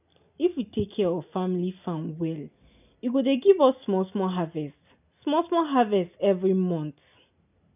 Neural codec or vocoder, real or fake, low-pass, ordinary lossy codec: none; real; 3.6 kHz; none